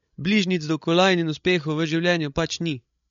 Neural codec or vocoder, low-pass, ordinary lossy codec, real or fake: codec, 16 kHz, 16 kbps, FreqCodec, larger model; 7.2 kHz; MP3, 48 kbps; fake